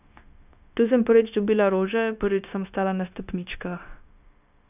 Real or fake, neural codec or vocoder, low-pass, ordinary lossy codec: fake; codec, 16 kHz, 0.9 kbps, LongCat-Audio-Codec; 3.6 kHz; none